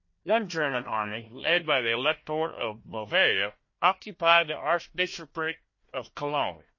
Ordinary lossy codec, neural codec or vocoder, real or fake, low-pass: MP3, 32 kbps; codec, 16 kHz, 1 kbps, FunCodec, trained on Chinese and English, 50 frames a second; fake; 7.2 kHz